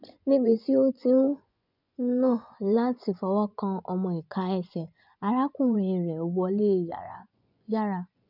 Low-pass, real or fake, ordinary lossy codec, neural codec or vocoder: 5.4 kHz; fake; none; vocoder, 24 kHz, 100 mel bands, Vocos